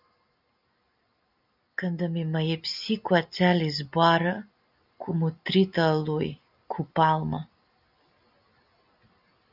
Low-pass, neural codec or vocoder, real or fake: 5.4 kHz; none; real